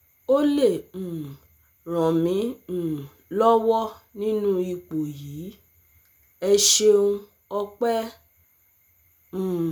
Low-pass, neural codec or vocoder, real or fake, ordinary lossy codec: none; none; real; none